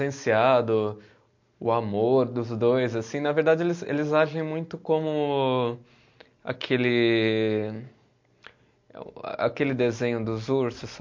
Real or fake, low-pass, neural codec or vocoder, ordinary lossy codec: real; 7.2 kHz; none; MP3, 48 kbps